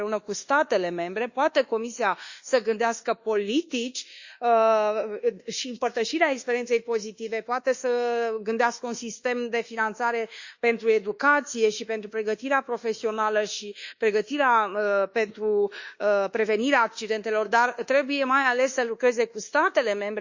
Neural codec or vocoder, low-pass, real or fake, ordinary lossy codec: codec, 24 kHz, 1.2 kbps, DualCodec; 7.2 kHz; fake; Opus, 64 kbps